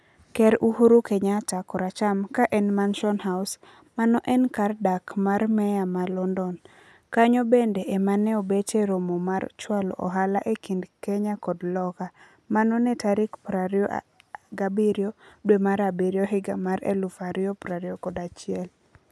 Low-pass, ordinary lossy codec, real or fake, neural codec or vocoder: none; none; real; none